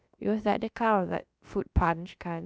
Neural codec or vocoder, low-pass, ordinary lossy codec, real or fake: codec, 16 kHz, about 1 kbps, DyCAST, with the encoder's durations; none; none; fake